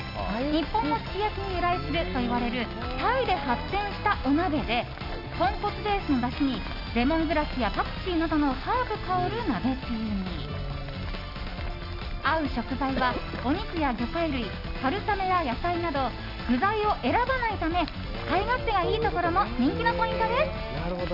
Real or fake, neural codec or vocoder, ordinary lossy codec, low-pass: real; none; none; 5.4 kHz